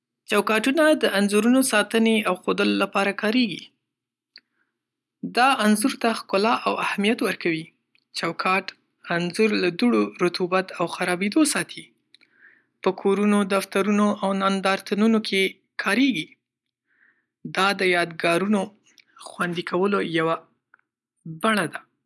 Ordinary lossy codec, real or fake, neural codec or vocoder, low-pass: none; real; none; none